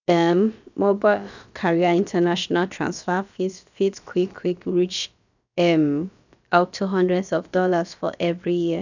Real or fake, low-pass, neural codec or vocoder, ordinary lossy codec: fake; 7.2 kHz; codec, 16 kHz, about 1 kbps, DyCAST, with the encoder's durations; none